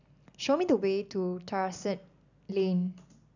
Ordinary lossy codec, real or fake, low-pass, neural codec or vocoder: none; real; 7.2 kHz; none